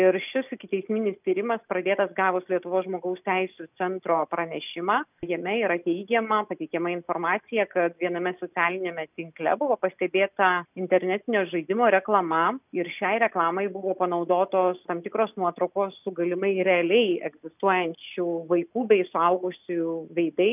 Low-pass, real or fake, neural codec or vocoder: 3.6 kHz; real; none